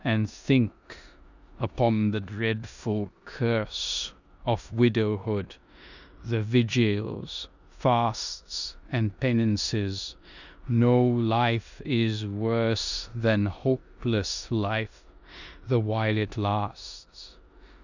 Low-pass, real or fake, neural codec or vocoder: 7.2 kHz; fake; codec, 16 kHz in and 24 kHz out, 0.9 kbps, LongCat-Audio-Codec, four codebook decoder